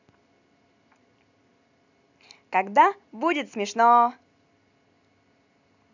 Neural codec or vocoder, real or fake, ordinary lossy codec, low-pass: none; real; none; 7.2 kHz